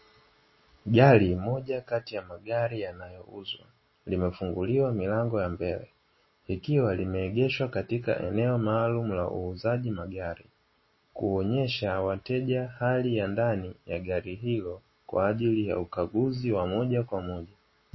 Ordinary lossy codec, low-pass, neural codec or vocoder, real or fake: MP3, 24 kbps; 7.2 kHz; none; real